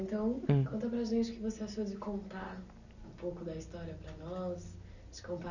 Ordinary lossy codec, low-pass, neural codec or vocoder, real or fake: none; 7.2 kHz; none; real